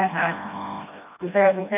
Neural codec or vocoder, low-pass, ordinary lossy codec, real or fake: codec, 16 kHz, 1 kbps, FreqCodec, smaller model; 3.6 kHz; none; fake